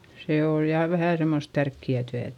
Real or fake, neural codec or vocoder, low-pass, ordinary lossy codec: real; none; 19.8 kHz; none